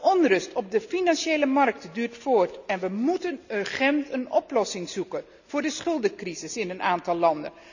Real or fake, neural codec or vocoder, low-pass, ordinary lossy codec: real; none; 7.2 kHz; none